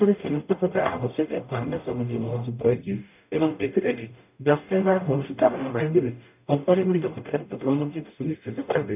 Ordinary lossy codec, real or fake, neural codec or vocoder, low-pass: none; fake; codec, 44.1 kHz, 0.9 kbps, DAC; 3.6 kHz